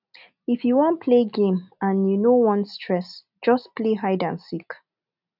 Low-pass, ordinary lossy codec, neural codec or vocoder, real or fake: 5.4 kHz; none; none; real